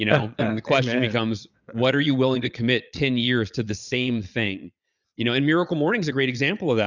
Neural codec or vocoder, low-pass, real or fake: vocoder, 22.05 kHz, 80 mel bands, Vocos; 7.2 kHz; fake